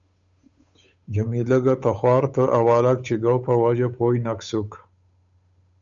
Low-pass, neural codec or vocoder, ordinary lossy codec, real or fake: 7.2 kHz; codec, 16 kHz, 8 kbps, FunCodec, trained on Chinese and English, 25 frames a second; Opus, 64 kbps; fake